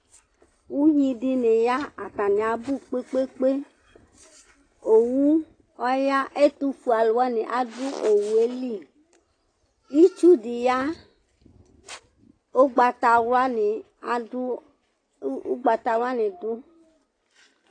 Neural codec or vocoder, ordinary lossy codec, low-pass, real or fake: none; AAC, 32 kbps; 9.9 kHz; real